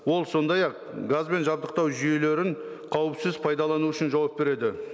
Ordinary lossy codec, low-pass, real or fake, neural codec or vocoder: none; none; real; none